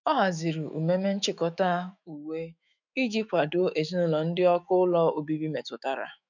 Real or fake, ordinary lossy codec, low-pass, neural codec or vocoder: fake; none; 7.2 kHz; autoencoder, 48 kHz, 128 numbers a frame, DAC-VAE, trained on Japanese speech